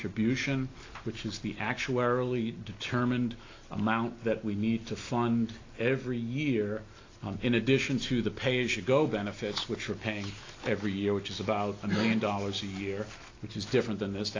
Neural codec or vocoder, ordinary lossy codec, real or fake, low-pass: none; AAC, 32 kbps; real; 7.2 kHz